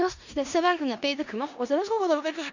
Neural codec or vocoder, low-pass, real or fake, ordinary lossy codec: codec, 16 kHz in and 24 kHz out, 0.4 kbps, LongCat-Audio-Codec, four codebook decoder; 7.2 kHz; fake; none